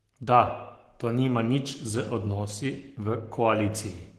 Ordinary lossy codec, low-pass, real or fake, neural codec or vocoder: Opus, 16 kbps; 14.4 kHz; fake; codec, 44.1 kHz, 7.8 kbps, Pupu-Codec